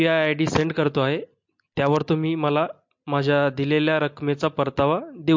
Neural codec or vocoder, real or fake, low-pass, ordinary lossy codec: none; real; 7.2 kHz; MP3, 48 kbps